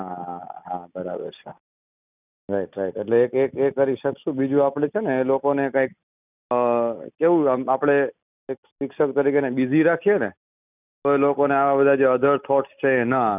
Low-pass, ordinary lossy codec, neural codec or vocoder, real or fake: 3.6 kHz; none; none; real